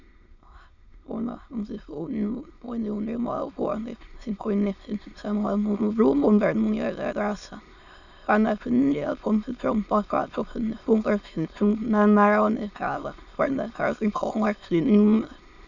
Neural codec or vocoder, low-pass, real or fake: autoencoder, 22.05 kHz, a latent of 192 numbers a frame, VITS, trained on many speakers; 7.2 kHz; fake